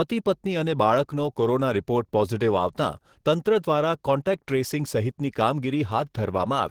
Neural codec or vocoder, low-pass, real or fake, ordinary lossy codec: codec, 44.1 kHz, 7.8 kbps, DAC; 19.8 kHz; fake; Opus, 16 kbps